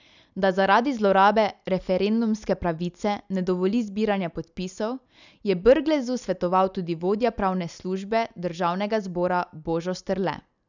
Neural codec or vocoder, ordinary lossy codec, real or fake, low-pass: none; none; real; 7.2 kHz